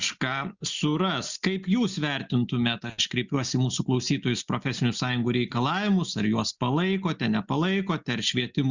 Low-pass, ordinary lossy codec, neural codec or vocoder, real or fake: 7.2 kHz; Opus, 64 kbps; none; real